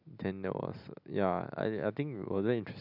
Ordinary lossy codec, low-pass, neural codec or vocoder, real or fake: none; 5.4 kHz; none; real